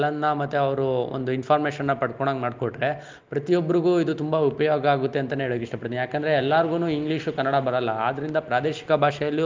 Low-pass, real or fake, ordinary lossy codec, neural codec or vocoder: 7.2 kHz; real; Opus, 32 kbps; none